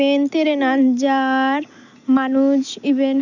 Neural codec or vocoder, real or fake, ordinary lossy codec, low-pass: none; real; none; 7.2 kHz